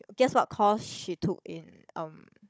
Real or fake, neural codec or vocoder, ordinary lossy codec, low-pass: fake; codec, 16 kHz, 16 kbps, FunCodec, trained on Chinese and English, 50 frames a second; none; none